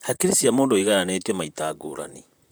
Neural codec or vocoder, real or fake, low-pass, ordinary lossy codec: vocoder, 44.1 kHz, 128 mel bands, Pupu-Vocoder; fake; none; none